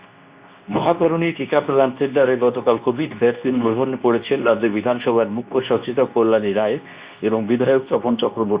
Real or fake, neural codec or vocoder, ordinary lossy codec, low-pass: fake; codec, 24 kHz, 0.9 kbps, WavTokenizer, medium speech release version 2; Opus, 64 kbps; 3.6 kHz